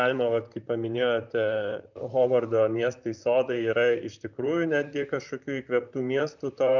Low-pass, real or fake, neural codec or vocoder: 7.2 kHz; fake; vocoder, 44.1 kHz, 128 mel bands, Pupu-Vocoder